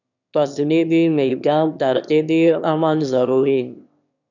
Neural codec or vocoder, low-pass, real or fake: autoencoder, 22.05 kHz, a latent of 192 numbers a frame, VITS, trained on one speaker; 7.2 kHz; fake